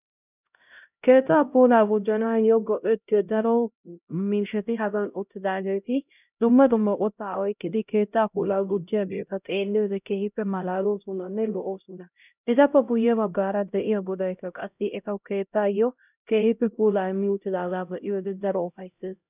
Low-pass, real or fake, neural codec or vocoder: 3.6 kHz; fake; codec, 16 kHz, 0.5 kbps, X-Codec, HuBERT features, trained on LibriSpeech